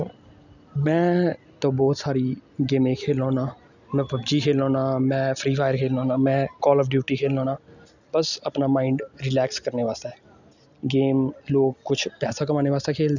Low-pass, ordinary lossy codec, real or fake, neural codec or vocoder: 7.2 kHz; none; real; none